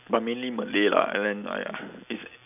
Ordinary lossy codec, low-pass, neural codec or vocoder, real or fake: none; 3.6 kHz; none; real